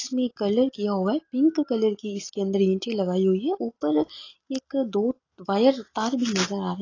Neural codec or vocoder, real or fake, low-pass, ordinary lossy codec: none; real; 7.2 kHz; AAC, 32 kbps